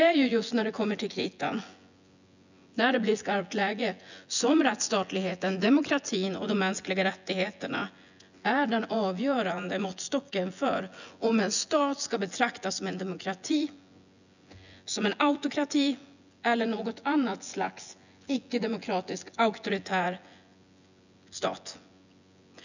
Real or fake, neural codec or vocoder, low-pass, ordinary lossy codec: fake; vocoder, 24 kHz, 100 mel bands, Vocos; 7.2 kHz; none